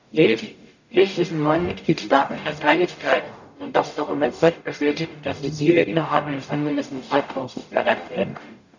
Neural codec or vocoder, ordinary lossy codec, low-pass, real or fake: codec, 44.1 kHz, 0.9 kbps, DAC; none; 7.2 kHz; fake